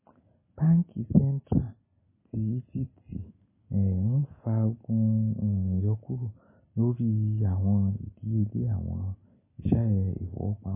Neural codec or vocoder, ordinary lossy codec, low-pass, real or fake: none; MP3, 16 kbps; 3.6 kHz; real